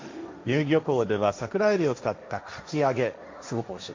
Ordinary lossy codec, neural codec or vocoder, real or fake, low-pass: MP3, 48 kbps; codec, 16 kHz, 1.1 kbps, Voila-Tokenizer; fake; 7.2 kHz